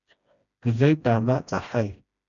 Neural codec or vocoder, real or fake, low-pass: codec, 16 kHz, 1 kbps, FreqCodec, smaller model; fake; 7.2 kHz